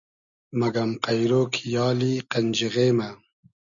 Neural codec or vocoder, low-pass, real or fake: none; 7.2 kHz; real